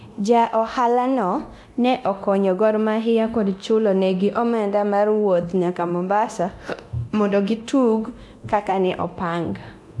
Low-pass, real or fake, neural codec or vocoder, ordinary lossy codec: none; fake; codec, 24 kHz, 0.9 kbps, DualCodec; none